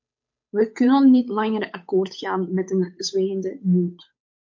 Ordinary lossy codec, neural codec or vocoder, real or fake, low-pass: MP3, 48 kbps; codec, 16 kHz, 8 kbps, FunCodec, trained on Chinese and English, 25 frames a second; fake; 7.2 kHz